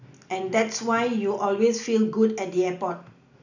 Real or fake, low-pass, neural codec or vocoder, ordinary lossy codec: real; 7.2 kHz; none; none